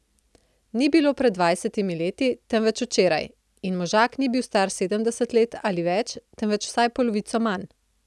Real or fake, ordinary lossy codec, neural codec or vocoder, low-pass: real; none; none; none